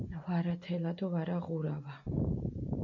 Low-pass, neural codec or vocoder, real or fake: 7.2 kHz; none; real